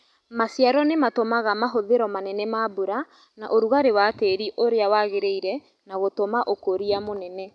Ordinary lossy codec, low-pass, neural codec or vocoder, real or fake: none; none; none; real